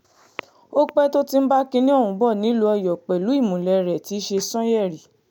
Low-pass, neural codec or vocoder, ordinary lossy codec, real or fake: 19.8 kHz; none; none; real